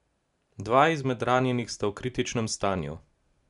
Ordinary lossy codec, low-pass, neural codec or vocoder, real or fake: none; 10.8 kHz; none; real